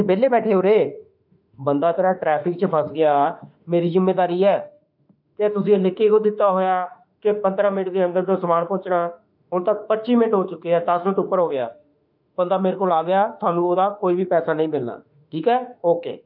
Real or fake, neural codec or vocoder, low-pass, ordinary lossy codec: fake; autoencoder, 48 kHz, 32 numbers a frame, DAC-VAE, trained on Japanese speech; 5.4 kHz; none